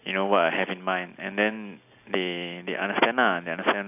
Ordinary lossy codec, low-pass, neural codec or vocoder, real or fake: AAC, 32 kbps; 3.6 kHz; none; real